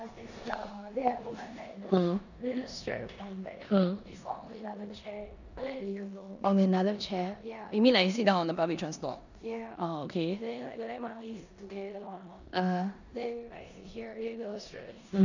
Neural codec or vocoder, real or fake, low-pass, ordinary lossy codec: codec, 16 kHz in and 24 kHz out, 0.9 kbps, LongCat-Audio-Codec, four codebook decoder; fake; 7.2 kHz; none